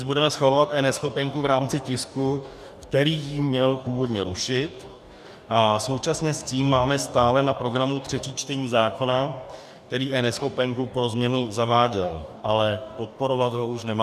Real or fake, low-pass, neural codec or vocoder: fake; 14.4 kHz; codec, 44.1 kHz, 2.6 kbps, DAC